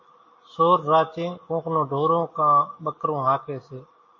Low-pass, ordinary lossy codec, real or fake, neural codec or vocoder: 7.2 kHz; MP3, 32 kbps; real; none